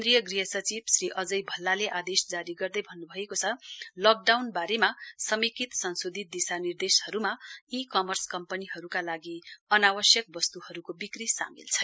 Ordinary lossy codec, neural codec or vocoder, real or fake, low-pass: none; none; real; none